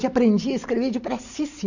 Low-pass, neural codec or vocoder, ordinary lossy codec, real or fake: 7.2 kHz; none; none; real